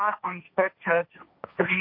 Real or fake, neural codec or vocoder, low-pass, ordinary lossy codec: fake; codec, 16 kHz, 1.1 kbps, Voila-Tokenizer; 5.4 kHz; MP3, 32 kbps